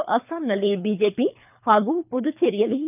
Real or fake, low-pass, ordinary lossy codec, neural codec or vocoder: fake; 3.6 kHz; none; codec, 24 kHz, 3 kbps, HILCodec